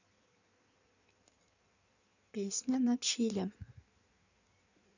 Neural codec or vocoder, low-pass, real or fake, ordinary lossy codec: codec, 16 kHz in and 24 kHz out, 1.1 kbps, FireRedTTS-2 codec; 7.2 kHz; fake; none